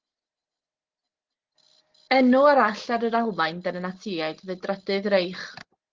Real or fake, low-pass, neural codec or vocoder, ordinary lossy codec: real; 7.2 kHz; none; Opus, 16 kbps